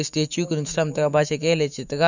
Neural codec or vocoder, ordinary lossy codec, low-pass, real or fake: autoencoder, 48 kHz, 128 numbers a frame, DAC-VAE, trained on Japanese speech; none; 7.2 kHz; fake